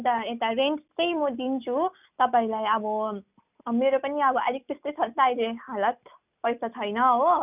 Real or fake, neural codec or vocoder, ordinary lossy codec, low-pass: real; none; none; 3.6 kHz